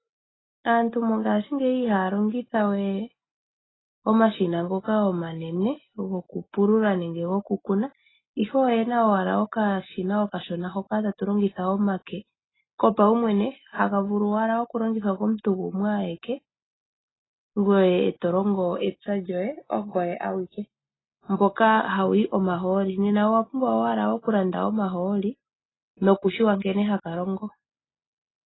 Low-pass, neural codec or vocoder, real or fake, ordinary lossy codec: 7.2 kHz; none; real; AAC, 16 kbps